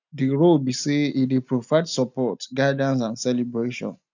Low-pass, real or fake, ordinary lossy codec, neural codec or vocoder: 7.2 kHz; real; none; none